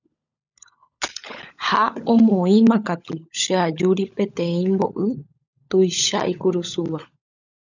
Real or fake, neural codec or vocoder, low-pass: fake; codec, 16 kHz, 16 kbps, FunCodec, trained on LibriTTS, 50 frames a second; 7.2 kHz